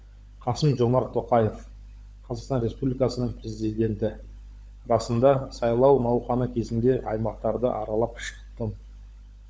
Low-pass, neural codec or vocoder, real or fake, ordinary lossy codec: none; codec, 16 kHz, 16 kbps, FunCodec, trained on LibriTTS, 50 frames a second; fake; none